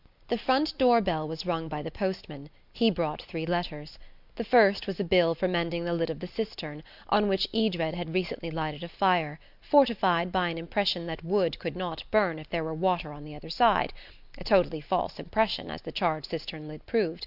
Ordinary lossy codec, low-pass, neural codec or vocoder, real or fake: Opus, 64 kbps; 5.4 kHz; none; real